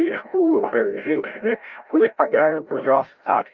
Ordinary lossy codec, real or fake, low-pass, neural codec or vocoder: Opus, 32 kbps; fake; 7.2 kHz; codec, 16 kHz, 0.5 kbps, FreqCodec, larger model